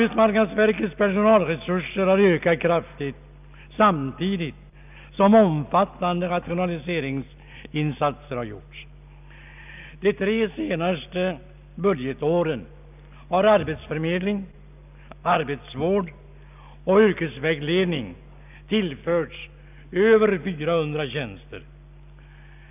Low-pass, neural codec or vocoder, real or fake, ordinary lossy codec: 3.6 kHz; none; real; none